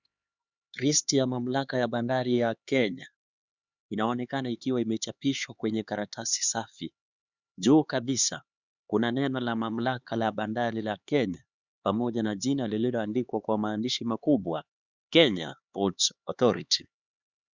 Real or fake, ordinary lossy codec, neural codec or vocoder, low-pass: fake; Opus, 64 kbps; codec, 16 kHz, 4 kbps, X-Codec, HuBERT features, trained on LibriSpeech; 7.2 kHz